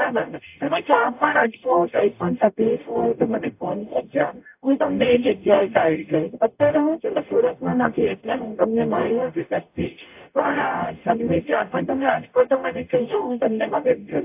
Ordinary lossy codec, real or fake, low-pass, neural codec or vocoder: AAC, 32 kbps; fake; 3.6 kHz; codec, 44.1 kHz, 0.9 kbps, DAC